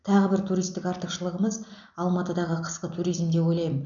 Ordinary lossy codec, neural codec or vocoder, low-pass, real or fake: none; none; 7.2 kHz; real